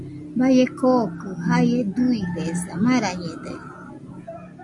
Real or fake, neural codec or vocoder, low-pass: real; none; 10.8 kHz